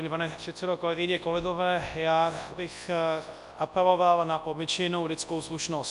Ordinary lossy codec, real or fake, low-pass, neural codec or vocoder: MP3, 96 kbps; fake; 10.8 kHz; codec, 24 kHz, 0.9 kbps, WavTokenizer, large speech release